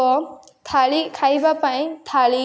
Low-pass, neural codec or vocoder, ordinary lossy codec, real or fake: none; none; none; real